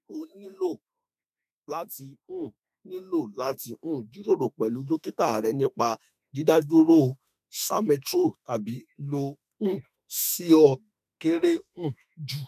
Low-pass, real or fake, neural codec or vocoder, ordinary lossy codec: 14.4 kHz; fake; autoencoder, 48 kHz, 32 numbers a frame, DAC-VAE, trained on Japanese speech; none